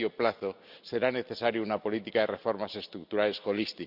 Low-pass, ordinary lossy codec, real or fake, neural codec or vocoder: 5.4 kHz; none; real; none